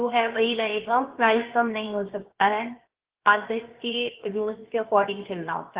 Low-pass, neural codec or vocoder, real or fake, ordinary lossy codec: 3.6 kHz; codec, 16 kHz, 0.7 kbps, FocalCodec; fake; Opus, 16 kbps